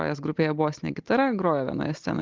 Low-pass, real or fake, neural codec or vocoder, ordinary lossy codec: 7.2 kHz; real; none; Opus, 32 kbps